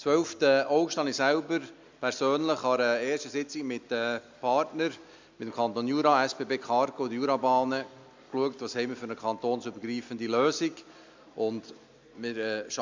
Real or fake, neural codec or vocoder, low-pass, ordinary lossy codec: real; none; 7.2 kHz; MP3, 64 kbps